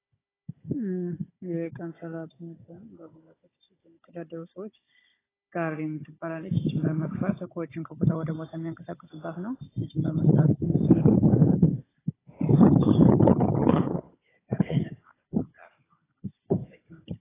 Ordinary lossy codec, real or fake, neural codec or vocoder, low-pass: AAC, 16 kbps; fake; codec, 16 kHz, 16 kbps, FunCodec, trained on Chinese and English, 50 frames a second; 3.6 kHz